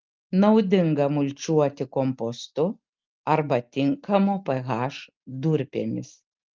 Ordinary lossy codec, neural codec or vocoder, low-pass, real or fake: Opus, 32 kbps; none; 7.2 kHz; real